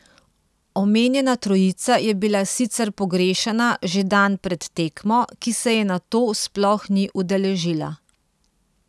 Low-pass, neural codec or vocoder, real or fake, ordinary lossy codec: none; none; real; none